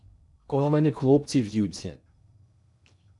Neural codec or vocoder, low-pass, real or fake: codec, 16 kHz in and 24 kHz out, 0.6 kbps, FocalCodec, streaming, 2048 codes; 10.8 kHz; fake